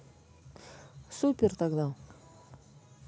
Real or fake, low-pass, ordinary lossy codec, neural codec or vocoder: real; none; none; none